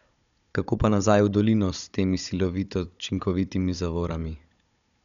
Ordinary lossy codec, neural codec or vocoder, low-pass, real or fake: none; codec, 16 kHz, 16 kbps, FunCodec, trained on Chinese and English, 50 frames a second; 7.2 kHz; fake